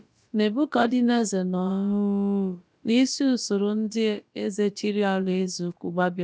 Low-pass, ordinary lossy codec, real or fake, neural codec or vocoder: none; none; fake; codec, 16 kHz, about 1 kbps, DyCAST, with the encoder's durations